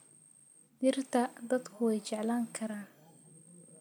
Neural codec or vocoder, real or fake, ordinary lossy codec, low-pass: none; real; none; none